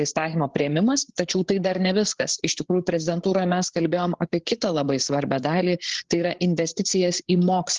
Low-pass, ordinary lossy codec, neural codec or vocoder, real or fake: 7.2 kHz; Opus, 16 kbps; codec, 16 kHz, 8 kbps, FreqCodec, larger model; fake